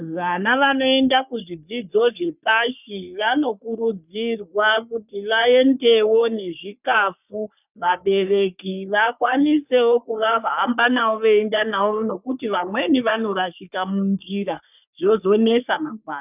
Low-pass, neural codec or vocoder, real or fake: 3.6 kHz; codec, 44.1 kHz, 3.4 kbps, Pupu-Codec; fake